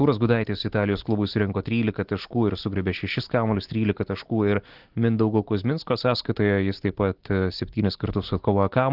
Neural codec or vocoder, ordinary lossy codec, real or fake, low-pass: none; Opus, 16 kbps; real; 5.4 kHz